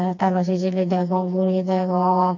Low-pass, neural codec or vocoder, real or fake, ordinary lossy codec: 7.2 kHz; codec, 16 kHz, 2 kbps, FreqCodec, smaller model; fake; none